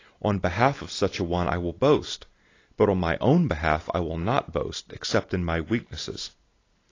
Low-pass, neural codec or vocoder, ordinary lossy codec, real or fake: 7.2 kHz; none; AAC, 32 kbps; real